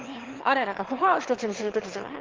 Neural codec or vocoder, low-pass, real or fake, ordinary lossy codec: autoencoder, 22.05 kHz, a latent of 192 numbers a frame, VITS, trained on one speaker; 7.2 kHz; fake; Opus, 16 kbps